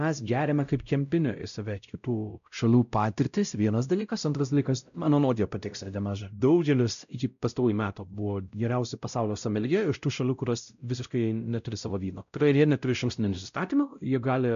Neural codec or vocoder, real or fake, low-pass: codec, 16 kHz, 0.5 kbps, X-Codec, WavLM features, trained on Multilingual LibriSpeech; fake; 7.2 kHz